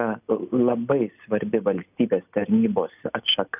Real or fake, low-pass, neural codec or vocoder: real; 3.6 kHz; none